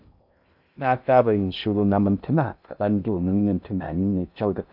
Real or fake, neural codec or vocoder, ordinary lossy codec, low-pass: fake; codec, 16 kHz in and 24 kHz out, 0.6 kbps, FocalCodec, streaming, 2048 codes; none; 5.4 kHz